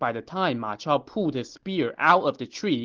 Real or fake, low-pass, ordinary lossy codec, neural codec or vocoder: real; 7.2 kHz; Opus, 16 kbps; none